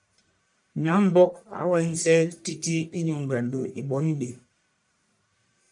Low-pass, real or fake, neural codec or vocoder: 10.8 kHz; fake; codec, 44.1 kHz, 1.7 kbps, Pupu-Codec